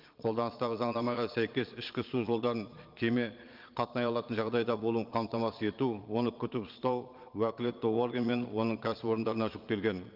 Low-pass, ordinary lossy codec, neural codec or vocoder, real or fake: 5.4 kHz; Opus, 24 kbps; vocoder, 22.05 kHz, 80 mel bands, Vocos; fake